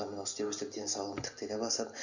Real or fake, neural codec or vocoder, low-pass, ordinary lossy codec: real; none; 7.2 kHz; none